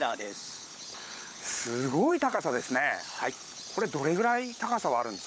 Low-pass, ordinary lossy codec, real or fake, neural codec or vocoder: none; none; fake; codec, 16 kHz, 16 kbps, FunCodec, trained on LibriTTS, 50 frames a second